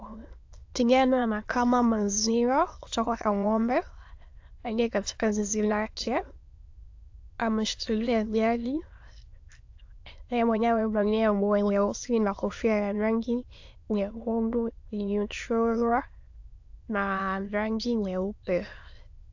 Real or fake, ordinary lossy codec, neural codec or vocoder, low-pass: fake; AAC, 48 kbps; autoencoder, 22.05 kHz, a latent of 192 numbers a frame, VITS, trained on many speakers; 7.2 kHz